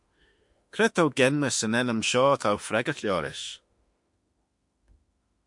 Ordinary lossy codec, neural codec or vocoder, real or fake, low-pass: MP3, 64 kbps; autoencoder, 48 kHz, 32 numbers a frame, DAC-VAE, trained on Japanese speech; fake; 10.8 kHz